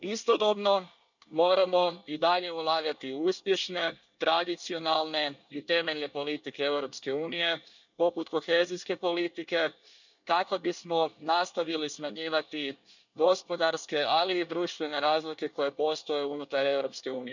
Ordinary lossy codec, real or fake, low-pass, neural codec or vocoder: none; fake; 7.2 kHz; codec, 24 kHz, 1 kbps, SNAC